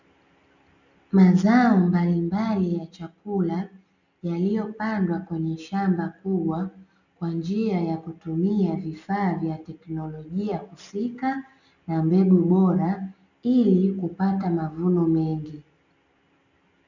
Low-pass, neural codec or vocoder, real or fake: 7.2 kHz; none; real